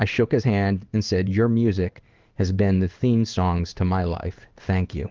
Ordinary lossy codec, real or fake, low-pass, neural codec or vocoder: Opus, 32 kbps; fake; 7.2 kHz; codec, 16 kHz in and 24 kHz out, 1 kbps, XY-Tokenizer